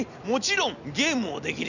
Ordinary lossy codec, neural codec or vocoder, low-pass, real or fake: none; none; 7.2 kHz; real